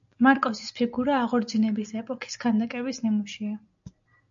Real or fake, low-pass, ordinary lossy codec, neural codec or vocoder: real; 7.2 kHz; MP3, 64 kbps; none